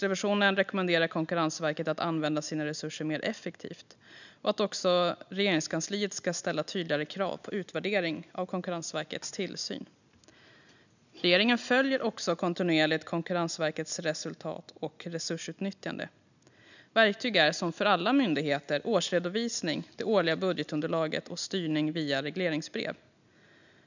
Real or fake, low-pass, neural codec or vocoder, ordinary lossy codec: real; 7.2 kHz; none; none